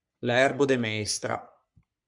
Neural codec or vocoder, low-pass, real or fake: codec, 44.1 kHz, 3.4 kbps, Pupu-Codec; 10.8 kHz; fake